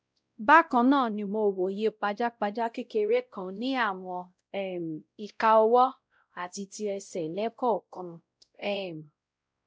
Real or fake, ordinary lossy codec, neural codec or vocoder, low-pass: fake; none; codec, 16 kHz, 0.5 kbps, X-Codec, WavLM features, trained on Multilingual LibriSpeech; none